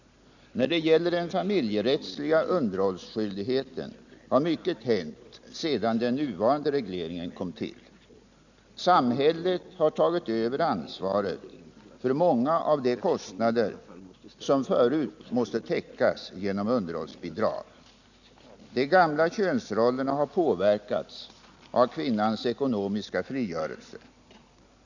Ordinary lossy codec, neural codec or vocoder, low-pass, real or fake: none; none; 7.2 kHz; real